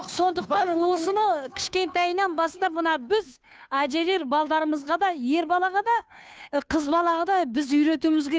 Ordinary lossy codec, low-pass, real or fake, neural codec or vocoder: none; none; fake; codec, 16 kHz, 2 kbps, FunCodec, trained on Chinese and English, 25 frames a second